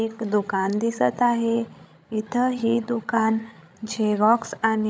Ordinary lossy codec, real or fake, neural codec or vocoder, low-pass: none; fake; codec, 16 kHz, 16 kbps, FreqCodec, larger model; none